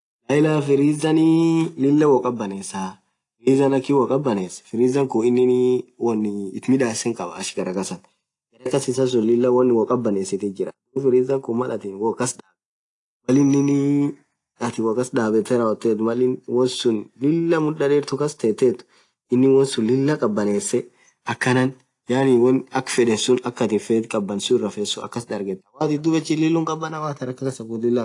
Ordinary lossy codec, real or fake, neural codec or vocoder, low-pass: AAC, 48 kbps; real; none; 10.8 kHz